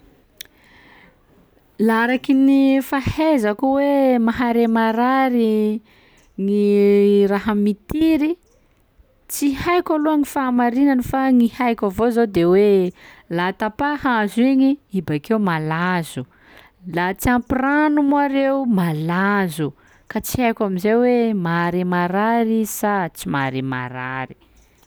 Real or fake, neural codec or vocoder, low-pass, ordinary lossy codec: real; none; none; none